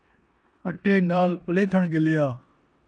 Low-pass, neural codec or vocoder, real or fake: 9.9 kHz; codec, 16 kHz in and 24 kHz out, 0.9 kbps, LongCat-Audio-Codec, four codebook decoder; fake